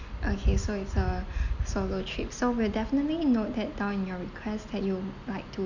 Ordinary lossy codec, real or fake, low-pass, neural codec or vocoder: none; real; 7.2 kHz; none